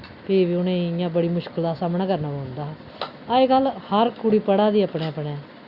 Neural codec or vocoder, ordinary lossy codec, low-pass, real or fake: none; Opus, 64 kbps; 5.4 kHz; real